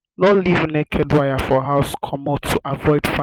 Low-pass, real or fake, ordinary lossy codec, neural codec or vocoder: 19.8 kHz; fake; Opus, 24 kbps; vocoder, 44.1 kHz, 128 mel bands every 256 samples, BigVGAN v2